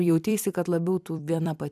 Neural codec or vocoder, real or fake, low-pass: vocoder, 44.1 kHz, 128 mel bands every 256 samples, BigVGAN v2; fake; 14.4 kHz